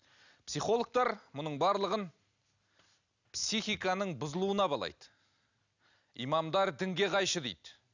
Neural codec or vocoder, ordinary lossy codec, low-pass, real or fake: none; none; 7.2 kHz; real